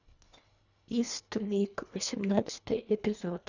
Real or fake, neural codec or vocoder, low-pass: fake; codec, 24 kHz, 1.5 kbps, HILCodec; 7.2 kHz